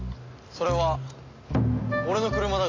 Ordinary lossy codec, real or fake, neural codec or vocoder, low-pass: AAC, 32 kbps; real; none; 7.2 kHz